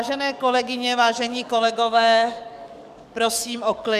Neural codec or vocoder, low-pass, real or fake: codec, 44.1 kHz, 7.8 kbps, DAC; 14.4 kHz; fake